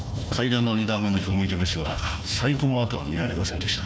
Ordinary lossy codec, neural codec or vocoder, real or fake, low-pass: none; codec, 16 kHz, 1 kbps, FunCodec, trained on Chinese and English, 50 frames a second; fake; none